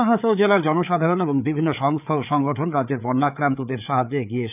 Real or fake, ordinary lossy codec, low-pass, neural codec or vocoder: fake; none; 3.6 kHz; codec, 16 kHz, 4 kbps, FreqCodec, larger model